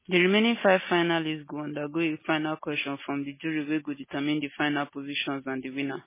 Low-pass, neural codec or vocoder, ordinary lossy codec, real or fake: 3.6 kHz; none; MP3, 16 kbps; real